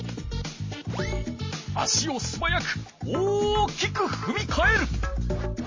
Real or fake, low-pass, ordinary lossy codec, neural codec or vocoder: real; 7.2 kHz; MP3, 32 kbps; none